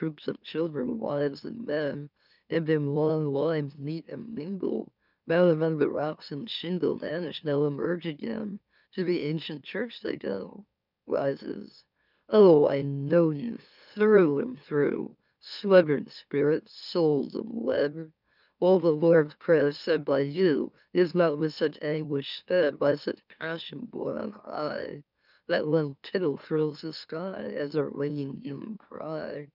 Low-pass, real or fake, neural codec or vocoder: 5.4 kHz; fake; autoencoder, 44.1 kHz, a latent of 192 numbers a frame, MeloTTS